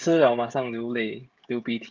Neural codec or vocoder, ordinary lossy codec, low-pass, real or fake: codec, 16 kHz, 16 kbps, FreqCodec, smaller model; Opus, 32 kbps; 7.2 kHz; fake